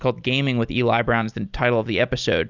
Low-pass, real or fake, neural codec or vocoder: 7.2 kHz; real; none